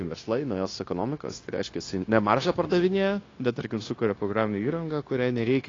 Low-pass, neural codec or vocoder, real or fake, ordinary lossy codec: 7.2 kHz; codec, 16 kHz, 0.9 kbps, LongCat-Audio-Codec; fake; AAC, 32 kbps